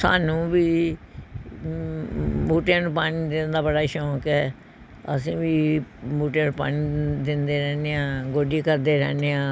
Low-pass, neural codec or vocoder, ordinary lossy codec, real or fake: none; none; none; real